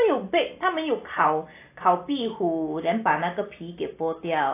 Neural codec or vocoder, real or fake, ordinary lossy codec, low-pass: none; real; AAC, 24 kbps; 3.6 kHz